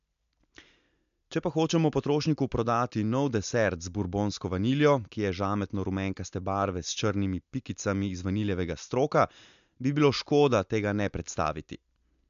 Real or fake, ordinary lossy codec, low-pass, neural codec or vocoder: real; MP3, 64 kbps; 7.2 kHz; none